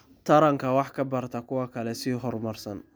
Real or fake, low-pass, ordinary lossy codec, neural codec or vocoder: real; none; none; none